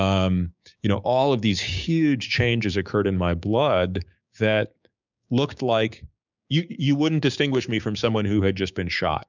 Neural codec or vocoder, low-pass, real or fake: codec, 16 kHz, 6 kbps, DAC; 7.2 kHz; fake